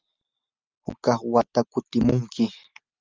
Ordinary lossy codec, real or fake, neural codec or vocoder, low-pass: Opus, 32 kbps; real; none; 7.2 kHz